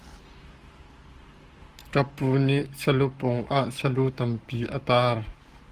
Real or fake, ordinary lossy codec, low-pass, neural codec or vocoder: fake; Opus, 24 kbps; 14.4 kHz; codec, 44.1 kHz, 7.8 kbps, Pupu-Codec